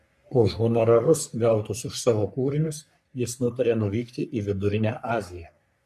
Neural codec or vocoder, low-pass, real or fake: codec, 44.1 kHz, 3.4 kbps, Pupu-Codec; 14.4 kHz; fake